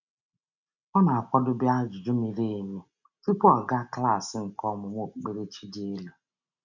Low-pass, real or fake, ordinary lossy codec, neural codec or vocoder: 7.2 kHz; real; none; none